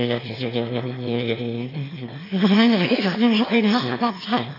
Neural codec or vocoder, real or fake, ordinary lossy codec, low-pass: autoencoder, 22.05 kHz, a latent of 192 numbers a frame, VITS, trained on one speaker; fake; AAC, 32 kbps; 5.4 kHz